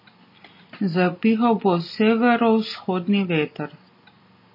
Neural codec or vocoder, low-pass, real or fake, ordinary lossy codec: none; 5.4 kHz; real; MP3, 24 kbps